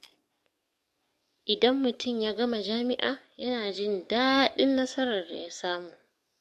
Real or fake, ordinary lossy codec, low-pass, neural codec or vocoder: fake; MP3, 64 kbps; 14.4 kHz; codec, 44.1 kHz, 7.8 kbps, DAC